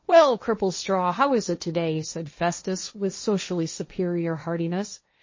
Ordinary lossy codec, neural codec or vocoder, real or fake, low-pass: MP3, 32 kbps; codec, 16 kHz, 1.1 kbps, Voila-Tokenizer; fake; 7.2 kHz